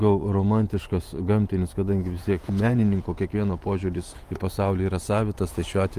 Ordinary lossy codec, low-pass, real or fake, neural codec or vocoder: Opus, 32 kbps; 14.4 kHz; fake; vocoder, 48 kHz, 128 mel bands, Vocos